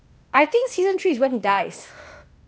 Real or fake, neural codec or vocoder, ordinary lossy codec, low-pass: fake; codec, 16 kHz, 0.8 kbps, ZipCodec; none; none